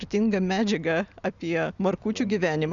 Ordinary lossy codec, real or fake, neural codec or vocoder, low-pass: Opus, 64 kbps; real; none; 7.2 kHz